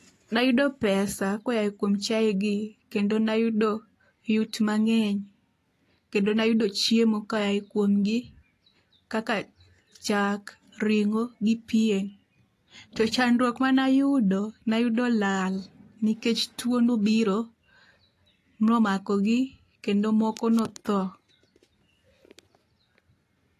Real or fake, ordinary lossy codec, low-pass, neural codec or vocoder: real; AAC, 48 kbps; 14.4 kHz; none